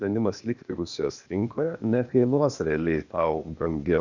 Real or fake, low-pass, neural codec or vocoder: fake; 7.2 kHz; codec, 16 kHz, 0.8 kbps, ZipCodec